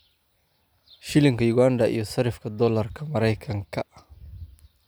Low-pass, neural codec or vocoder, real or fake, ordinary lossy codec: none; none; real; none